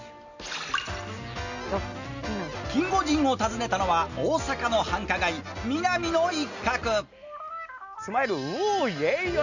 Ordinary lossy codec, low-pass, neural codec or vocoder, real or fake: none; 7.2 kHz; none; real